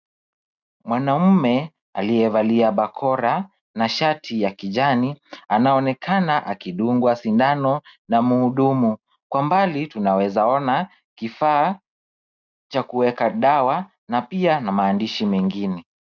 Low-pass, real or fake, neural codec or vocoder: 7.2 kHz; real; none